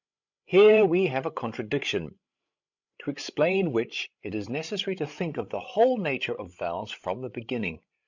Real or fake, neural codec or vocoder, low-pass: fake; codec, 16 kHz, 16 kbps, FreqCodec, larger model; 7.2 kHz